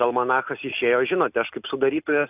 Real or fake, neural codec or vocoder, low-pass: real; none; 3.6 kHz